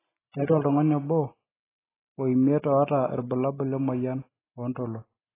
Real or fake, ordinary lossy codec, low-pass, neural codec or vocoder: real; AAC, 16 kbps; 3.6 kHz; none